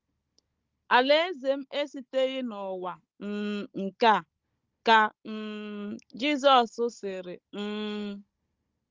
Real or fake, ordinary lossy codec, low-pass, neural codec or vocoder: fake; Opus, 32 kbps; 7.2 kHz; codec, 16 kHz, 16 kbps, FunCodec, trained on Chinese and English, 50 frames a second